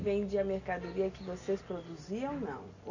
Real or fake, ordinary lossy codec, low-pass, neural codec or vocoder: real; none; 7.2 kHz; none